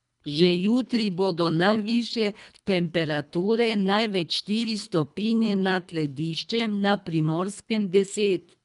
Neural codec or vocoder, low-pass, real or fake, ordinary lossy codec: codec, 24 kHz, 1.5 kbps, HILCodec; 10.8 kHz; fake; MP3, 96 kbps